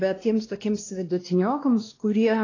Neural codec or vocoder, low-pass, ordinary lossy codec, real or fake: codec, 16 kHz, 1 kbps, X-Codec, WavLM features, trained on Multilingual LibriSpeech; 7.2 kHz; AAC, 32 kbps; fake